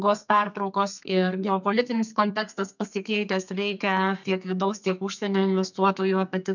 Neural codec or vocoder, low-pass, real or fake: codec, 32 kHz, 1.9 kbps, SNAC; 7.2 kHz; fake